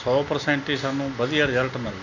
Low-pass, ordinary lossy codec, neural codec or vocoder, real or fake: 7.2 kHz; none; none; real